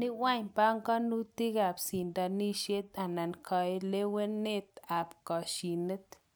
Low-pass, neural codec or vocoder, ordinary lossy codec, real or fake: none; none; none; real